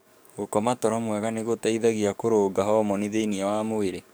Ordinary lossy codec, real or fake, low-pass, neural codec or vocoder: none; fake; none; codec, 44.1 kHz, 7.8 kbps, DAC